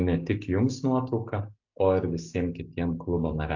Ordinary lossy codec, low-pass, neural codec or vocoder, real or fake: AAC, 48 kbps; 7.2 kHz; none; real